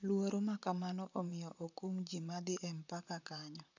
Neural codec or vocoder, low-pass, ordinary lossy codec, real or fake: vocoder, 44.1 kHz, 128 mel bands, Pupu-Vocoder; 7.2 kHz; none; fake